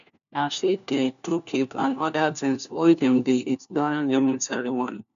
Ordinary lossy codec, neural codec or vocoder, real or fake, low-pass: none; codec, 16 kHz, 1 kbps, FunCodec, trained on LibriTTS, 50 frames a second; fake; 7.2 kHz